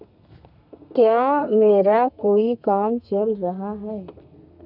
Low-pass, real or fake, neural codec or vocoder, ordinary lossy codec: 5.4 kHz; fake; codec, 32 kHz, 1.9 kbps, SNAC; none